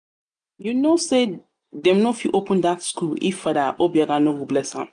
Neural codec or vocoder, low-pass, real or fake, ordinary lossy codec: none; 9.9 kHz; real; none